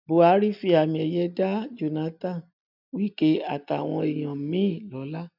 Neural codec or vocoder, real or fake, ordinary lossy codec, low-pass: none; real; none; 5.4 kHz